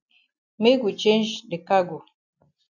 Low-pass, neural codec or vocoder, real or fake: 7.2 kHz; none; real